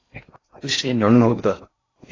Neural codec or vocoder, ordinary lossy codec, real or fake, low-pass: codec, 16 kHz in and 24 kHz out, 0.6 kbps, FocalCodec, streaming, 4096 codes; AAC, 32 kbps; fake; 7.2 kHz